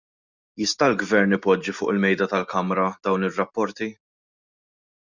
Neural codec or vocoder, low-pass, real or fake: none; 7.2 kHz; real